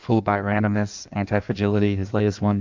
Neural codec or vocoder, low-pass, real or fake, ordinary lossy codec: codec, 16 kHz in and 24 kHz out, 1.1 kbps, FireRedTTS-2 codec; 7.2 kHz; fake; MP3, 64 kbps